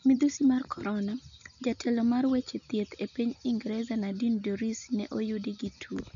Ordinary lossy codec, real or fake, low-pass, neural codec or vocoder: none; real; 7.2 kHz; none